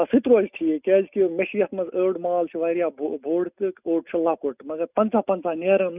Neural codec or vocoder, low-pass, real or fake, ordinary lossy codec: none; 3.6 kHz; real; none